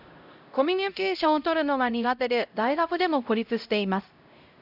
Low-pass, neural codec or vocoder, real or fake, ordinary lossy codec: 5.4 kHz; codec, 16 kHz, 0.5 kbps, X-Codec, HuBERT features, trained on LibriSpeech; fake; none